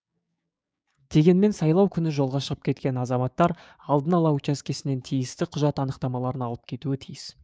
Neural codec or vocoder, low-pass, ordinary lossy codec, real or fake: codec, 16 kHz, 6 kbps, DAC; none; none; fake